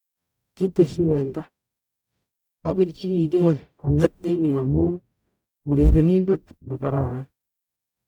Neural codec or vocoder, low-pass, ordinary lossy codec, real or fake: codec, 44.1 kHz, 0.9 kbps, DAC; 19.8 kHz; none; fake